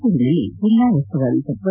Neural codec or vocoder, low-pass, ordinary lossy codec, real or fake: none; 3.6 kHz; none; real